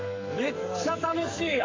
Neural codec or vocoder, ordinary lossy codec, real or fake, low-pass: codec, 44.1 kHz, 2.6 kbps, SNAC; AAC, 32 kbps; fake; 7.2 kHz